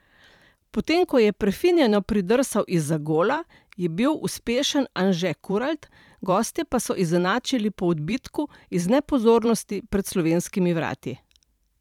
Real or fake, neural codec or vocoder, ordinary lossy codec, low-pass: fake; vocoder, 44.1 kHz, 128 mel bands every 512 samples, BigVGAN v2; none; 19.8 kHz